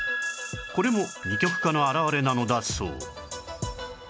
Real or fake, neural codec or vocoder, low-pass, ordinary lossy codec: real; none; none; none